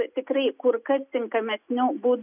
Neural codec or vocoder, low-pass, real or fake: none; 3.6 kHz; real